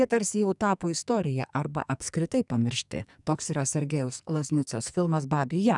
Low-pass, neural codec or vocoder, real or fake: 10.8 kHz; codec, 44.1 kHz, 2.6 kbps, SNAC; fake